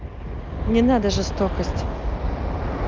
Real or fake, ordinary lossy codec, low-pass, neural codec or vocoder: real; Opus, 24 kbps; 7.2 kHz; none